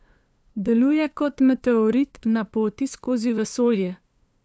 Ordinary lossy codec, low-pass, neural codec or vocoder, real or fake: none; none; codec, 16 kHz, 2 kbps, FunCodec, trained on LibriTTS, 25 frames a second; fake